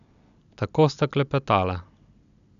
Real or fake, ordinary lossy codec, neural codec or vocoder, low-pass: real; none; none; 7.2 kHz